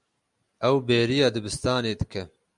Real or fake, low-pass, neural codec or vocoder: real; 10.8 kHz; none